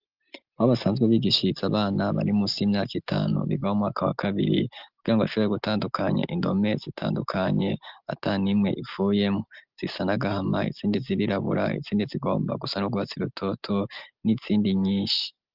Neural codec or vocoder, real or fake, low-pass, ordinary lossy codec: none; real; 5.4 kHz; Opus, 32 kbps